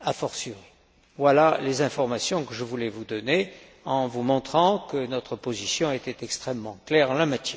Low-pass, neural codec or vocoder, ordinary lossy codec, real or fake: none; none; none; real